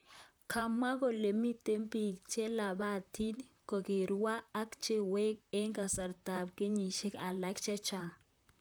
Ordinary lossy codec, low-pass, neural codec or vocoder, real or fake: none; none; vocoder, 44.1 kHz, 128 mel bands, Pupu-Vocoder; fake